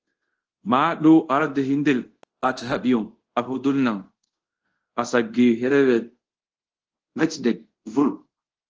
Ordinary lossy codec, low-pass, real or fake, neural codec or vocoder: Opus, 16 kbps; 7.2 kHz; fake; codec, 24 kHz, 0.5 kbps, DualCodec